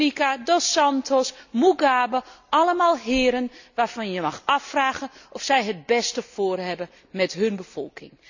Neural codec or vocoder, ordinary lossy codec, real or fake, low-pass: none; none; real; 7.2 kHz